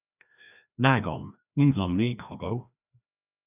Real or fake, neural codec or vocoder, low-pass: fake; codec, 16 kHz, 1 kbps, FreqCodec, larger model; 3.6 kHz